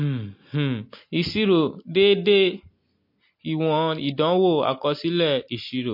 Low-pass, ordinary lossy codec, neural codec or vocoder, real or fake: 5.4 kHz; MP3, 32 kbps; none; real